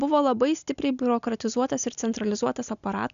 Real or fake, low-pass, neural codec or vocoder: real; 7.2 kHz; none